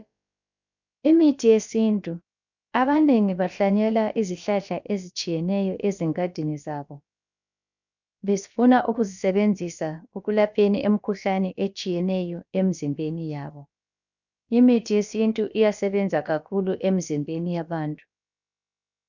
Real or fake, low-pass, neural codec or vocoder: fake; 7.2 kHz; codec, 16 kHz, about 1 kbps, DyCAST, with the encoder's durations